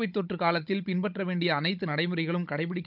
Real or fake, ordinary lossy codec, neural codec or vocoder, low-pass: fake; none; codec, 16 kHz, 16 kbps, FunCodec, trained on Chinese and English, 50 frames a second; 5.4 kHz